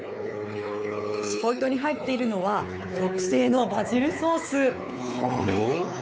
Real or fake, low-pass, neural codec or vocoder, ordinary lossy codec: fake; none; codec, 16 kHz, 4 kbps, X-Codec, WavLM features, trained on Multilingual LibriSpeech; none